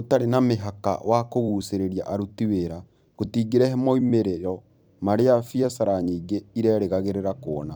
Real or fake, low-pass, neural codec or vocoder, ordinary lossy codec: fake; none; vocoder, 44.1 kHz, 128 mel bands every 256 samples, BigVGAN v2; none